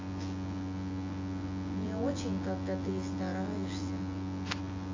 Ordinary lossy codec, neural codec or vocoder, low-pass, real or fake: AAC, 32 kbps; vocoder, 24 kHz, 100 mel bands, Vocos; 7.2 kHz; fake